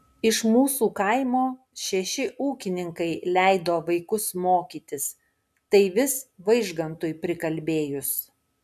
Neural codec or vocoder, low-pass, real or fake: none; 14.4 kHz; real